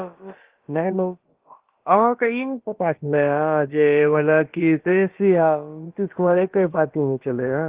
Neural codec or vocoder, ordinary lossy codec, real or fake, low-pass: codec, 16 kHz, about 1 kbps, DyCAST, with the encoder's durations; Opus, 16 kbps; fake; 3.6 kHz